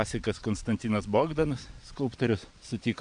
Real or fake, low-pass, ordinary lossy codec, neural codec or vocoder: fake; 9.9 kHz; MP3, 64 kbps; vocoder, 22.05 kHz, 80 mel bands, Vocos